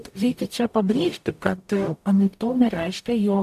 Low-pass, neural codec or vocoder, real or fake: 14.4 kHz; codec, 44.1 kHz, 0.9 kbps, DAC; fake